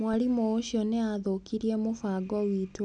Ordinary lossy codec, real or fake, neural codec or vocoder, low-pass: none; real; none; 10.8 kHz